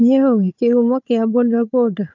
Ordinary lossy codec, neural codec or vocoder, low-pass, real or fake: none; codec, 16 kHz, 4 kbps, FunCodec, trained on Chinese and English, 50 frames a second; 7.2 kHz; fake